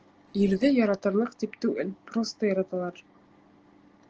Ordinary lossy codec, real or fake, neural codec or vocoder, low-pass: Opus, 16 kbps; real; none; 7.2 kHz